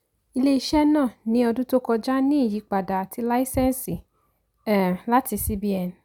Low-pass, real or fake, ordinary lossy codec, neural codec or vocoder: 19.8 kHz; real; none; none